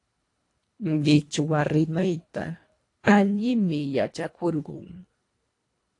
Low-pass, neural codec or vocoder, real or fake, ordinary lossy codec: 10.8 kHz; codec, 24 kHz, 1.5 kbps, HILCodec; fake; AAC, 48 kbps